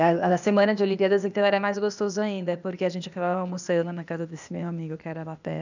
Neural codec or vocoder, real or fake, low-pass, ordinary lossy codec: codec, 16 kHz, 0.8 kbps, ZipCodec; fake; 7.2 kHz; none